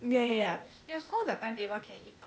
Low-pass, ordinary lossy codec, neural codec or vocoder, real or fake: none; none; codec, 16 kHz, 0.8 kbps, ZipCodec; fake